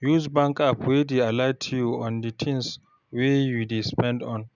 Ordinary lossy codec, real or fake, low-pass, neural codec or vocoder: none; real; 7.2 kHz; none